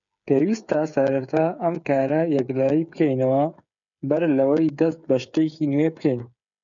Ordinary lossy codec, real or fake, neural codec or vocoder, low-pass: MP3, 96 kbps; fake; codec, 16 kHz, 8 kbps, FreqCodec, smaller model; 7.2 kHz